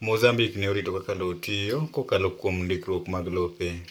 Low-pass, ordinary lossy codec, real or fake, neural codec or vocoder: none; none; fake; codec, 44.1 kHz, 7.8 kbps, Pupu-Codec